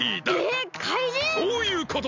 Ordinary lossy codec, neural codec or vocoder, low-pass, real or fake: MP3, 64 kbps; none; 7.2 kHz; real